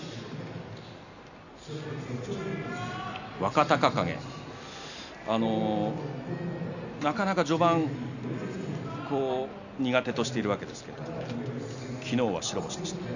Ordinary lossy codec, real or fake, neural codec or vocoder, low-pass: none; real; none; 7.2 kHz